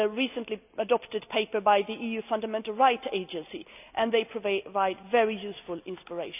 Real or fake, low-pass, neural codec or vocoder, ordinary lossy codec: real; 3.6 kHz; none; none